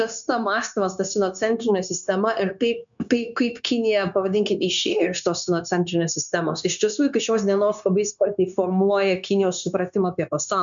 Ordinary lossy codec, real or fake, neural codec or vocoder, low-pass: MP3, 96 kbps; fake; codec, 16 kHz, 0.9 kbps, LongCat-Audio-Codec; 7.2 kHz